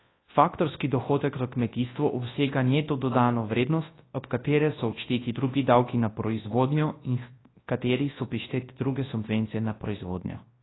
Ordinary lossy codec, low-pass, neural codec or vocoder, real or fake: AAC, 16 kbps; 7.2 kHz; codec, 24 kHz, 0.9 kbps, WavTokenizer, large speech release; fake